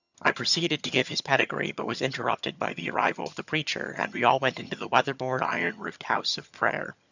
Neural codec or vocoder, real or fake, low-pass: vocoder, 22.05 kHz, 80 mel bands, HiFi-GAN; fake; 7.2 kHz